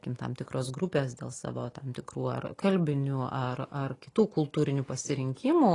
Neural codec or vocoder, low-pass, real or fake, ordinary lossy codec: none; 10.8 kHz; real; AAC, 32 kbps